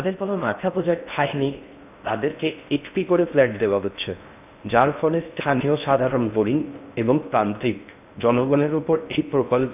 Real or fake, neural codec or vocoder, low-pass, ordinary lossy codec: fake; codec, 16 kHz in and 24 kHz out, 0.6 kbps, FocalCodec, streaming, 4096 codes; 3.6 kHz; none